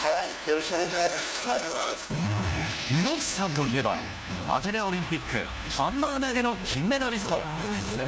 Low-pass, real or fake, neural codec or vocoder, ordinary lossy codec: none; fake; codec, 16 kHz, 1 kbps, FunCodec, trained on LibriTTS, 50 frames a second; none